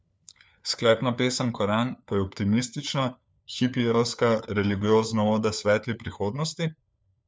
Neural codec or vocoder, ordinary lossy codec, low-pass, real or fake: codec, 16 kHz, 4 kbps, FunCodec, trained on LibriTTS, 50 frames a second; none; none; fake